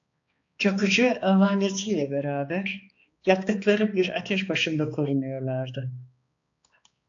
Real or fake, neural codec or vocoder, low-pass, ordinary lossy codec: fake; codec, 16 kHz, 2 kbps, X-Codec, HuBERT features, trained on balanced general audio; 7.2 kHz; AAC, 48 kbps